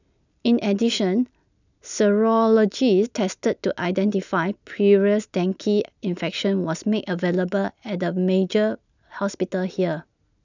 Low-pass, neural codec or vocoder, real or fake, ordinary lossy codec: 7.2 kHz; none; real; none